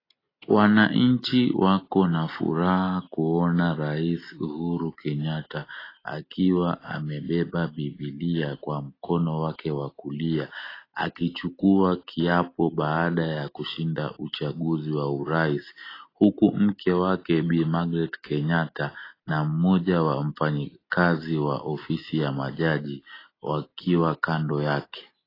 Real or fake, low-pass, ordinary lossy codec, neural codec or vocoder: real; 5.4 kHz; AAC, 24 kbps; none